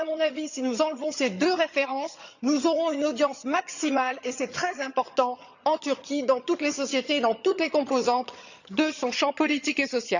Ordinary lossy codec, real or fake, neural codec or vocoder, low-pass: none; fake; vocoder, 22.05 kHz, 80 mel bands, HiFi-GAN; 7.2 kHz